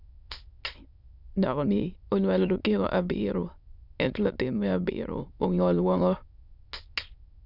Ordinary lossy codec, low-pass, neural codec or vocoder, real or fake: none; 5.4 kHz; autoencoder, 22.05 kHz, a latent of 192 numbers a frame, VITS, trained on many speakers; fake